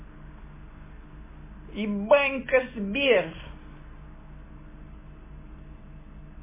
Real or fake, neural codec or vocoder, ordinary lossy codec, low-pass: real; none; MP3, 16 kbps; 3.6 kHz